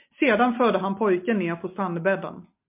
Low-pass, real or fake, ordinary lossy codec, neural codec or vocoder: 3.6 kHz; real; MP3, 32 kbps; none